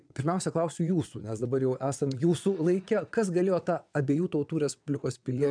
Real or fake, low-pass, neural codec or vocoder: fake; 9.9 kHz; vocoder, 22.05 kHz, 80 mel bands, WaveNeXt